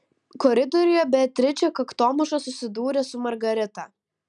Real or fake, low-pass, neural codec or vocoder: real; 10.8 kHz; none